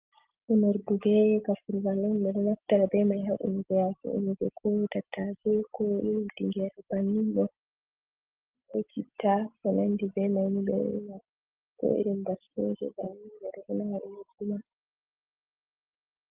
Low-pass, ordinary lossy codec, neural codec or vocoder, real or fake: 3.6 kHz; Opus, 24 kbps; none; real